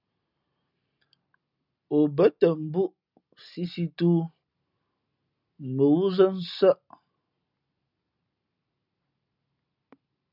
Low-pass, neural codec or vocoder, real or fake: 5.4 kHz; none; real